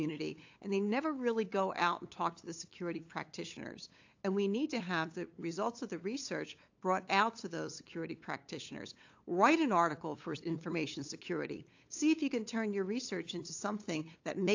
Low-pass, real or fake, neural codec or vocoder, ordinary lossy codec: 7.2 kHz; fake; codec, 16 kHz, 8 kbps, FunCodec, trained on LibriTTS, 25 frames a second; AAC, 48 kbps